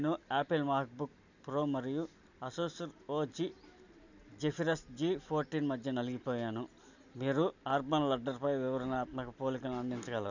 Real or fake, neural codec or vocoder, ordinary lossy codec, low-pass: real; none; none; 7.2 kHz